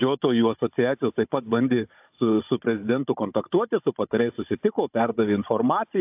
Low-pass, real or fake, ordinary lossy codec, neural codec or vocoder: 3.6 kHz; fake; AAC, 32 kbps; codec, 16 kHz, 16 kbps, FunCodec, trained on Chinese and English, 50 frames a second